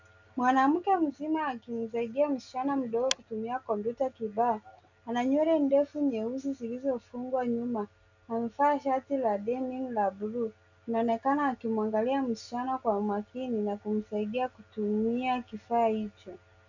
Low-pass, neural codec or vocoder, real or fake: 7.2 kHz; none; real